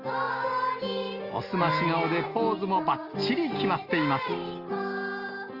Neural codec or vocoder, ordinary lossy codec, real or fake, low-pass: none; Opus, 32 kbps; real; 5.4 kHz